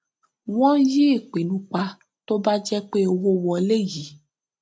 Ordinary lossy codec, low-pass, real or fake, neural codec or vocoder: none; none; real; none